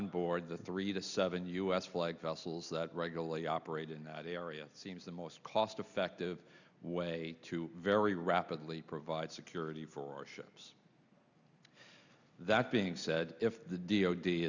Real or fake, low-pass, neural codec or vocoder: real; 7.2 kHz; none